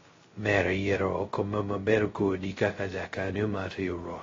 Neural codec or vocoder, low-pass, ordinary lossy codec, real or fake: codec, 16 kHz, 0.2 kbps, FocalCodec; 7.2 kHz; AAC, 24 kbps; fake